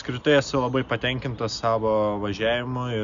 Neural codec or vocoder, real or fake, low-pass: none; real; 7.2 kHz